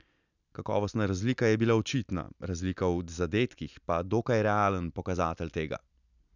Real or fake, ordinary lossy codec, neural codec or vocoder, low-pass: real; none; none; 7.2 kHz